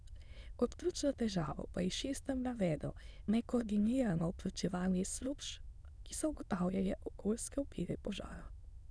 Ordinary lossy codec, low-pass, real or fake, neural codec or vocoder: none; none; fake; autoencoder, 22.05 kHz, a latent of 192 numbers a frame, VITS, trained on many speakers